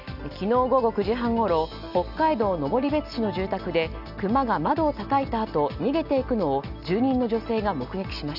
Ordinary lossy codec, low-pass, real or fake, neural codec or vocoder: none; 5.4 kHz; real; none